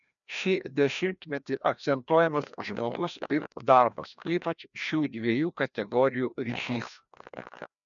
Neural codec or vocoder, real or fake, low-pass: codec, 16 kHz, 1 kbps, FreqCodec, larger model; fake; 7.2 kHz